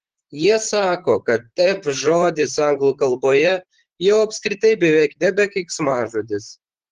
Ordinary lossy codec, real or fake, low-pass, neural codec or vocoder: Opus, 16 kbps; fake; 14.4 kHz; vocoder, 44.1 kHz, 128 mel bands, Pupu-Vocoder